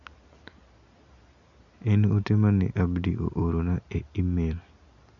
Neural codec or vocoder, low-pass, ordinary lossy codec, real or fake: none; 7.2 kHz; none; real